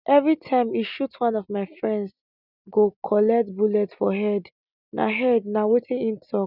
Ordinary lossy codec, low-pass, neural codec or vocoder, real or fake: none; 5.4 kHz; none; real